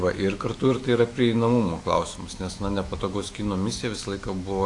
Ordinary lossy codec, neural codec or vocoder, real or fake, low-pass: AAC, 48 kbps; autoencoder, 48 kHz, 128 numbers a frame, DAC-VAE, trained on Japanese speech; fake; 10.8 kHz